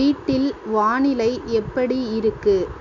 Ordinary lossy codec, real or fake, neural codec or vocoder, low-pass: MP3, 64 kbps; real; none; 7.2 kHz